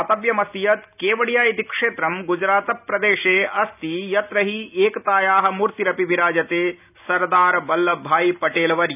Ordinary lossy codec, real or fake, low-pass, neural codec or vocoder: none; real; 3.6 kHz; none